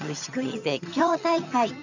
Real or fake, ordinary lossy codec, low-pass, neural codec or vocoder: fake; none; 7.2 kHz; vocoder, 22.05 kHz, 80 mel bands, HiFi-GAN